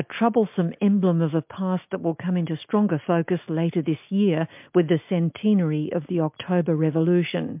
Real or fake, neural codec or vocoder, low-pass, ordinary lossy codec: real; none; 3.6 kHz; MP3, 32 kbps